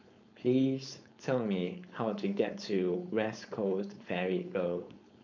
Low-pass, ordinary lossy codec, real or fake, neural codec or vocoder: 7.2 kHz; none; fake; codec, 16 kHz, 4.8 kbps, FACodec